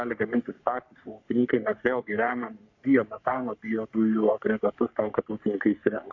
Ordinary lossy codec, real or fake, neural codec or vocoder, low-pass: MP3, 64 kbps; fake; codec, 44.1 kHz, 3.4 kbps, Pupu-Codec; 7.2 kHz